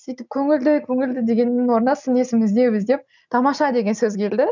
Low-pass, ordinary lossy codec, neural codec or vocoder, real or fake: 7.2 kHz; none; none; real